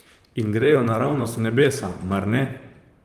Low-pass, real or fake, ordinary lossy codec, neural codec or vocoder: 14.4 kHz; fake; Opus, 32 kbps; vocoder, 44.1 kHz, 128 mel bands, Pupu-Vocoder